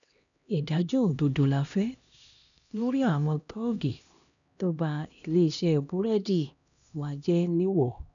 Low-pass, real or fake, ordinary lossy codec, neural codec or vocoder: 7.2 kHz; fake; none; codec, 16 kHz, 1 kbps, X-Codec, HuBERT features, trained on LibriSpeech